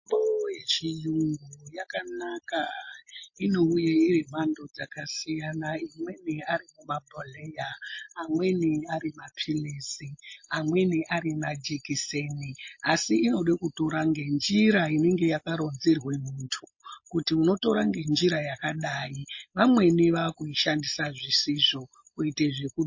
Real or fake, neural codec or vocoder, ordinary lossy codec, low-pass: real; none; MP3, 32 kbps; 7.2 kHz